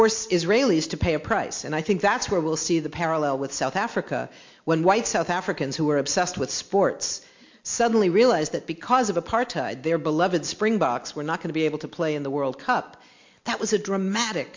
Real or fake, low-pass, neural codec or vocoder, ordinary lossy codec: real; 7.2 kHz; none; MP3, 48 kbps